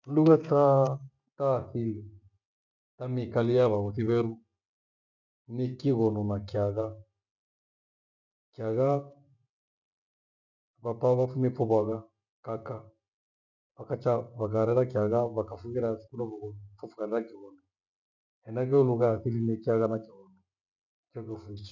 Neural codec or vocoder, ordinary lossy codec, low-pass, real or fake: none; none; 7.2 kHz; real